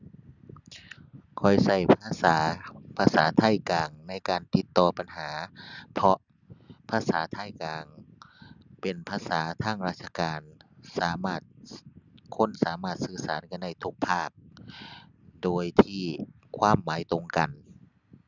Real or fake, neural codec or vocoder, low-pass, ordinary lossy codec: real; none; 7.2 kHz; none